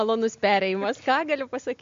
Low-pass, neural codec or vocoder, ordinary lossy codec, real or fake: 7.2 kHz; none; MP3, 48 kbps; real